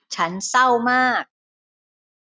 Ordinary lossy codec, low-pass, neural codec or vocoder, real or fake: none; none; none; real